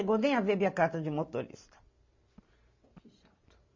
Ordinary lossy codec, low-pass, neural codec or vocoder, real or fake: MP3, 48 kbps; 7.2 kHz; none; real